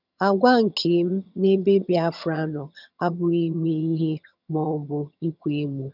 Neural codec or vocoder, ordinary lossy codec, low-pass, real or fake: vocoder, 22.05 kHz, 80 mel bands, HiFi-GAN; none; 5.4 kHz; fake